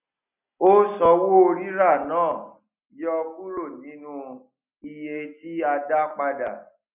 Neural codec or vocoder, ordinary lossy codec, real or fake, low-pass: none; none; real; 3.6 kHz